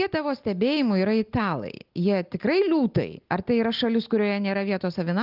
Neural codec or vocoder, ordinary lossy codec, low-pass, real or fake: none; Opus, 32 kbps; 5.4 kHz; real